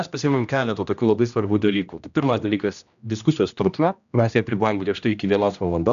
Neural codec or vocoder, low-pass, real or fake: codec, 16 kHz, 1 kbps, X-Codec, HuBERT features, trained on general audio; 7.2 kHz; fake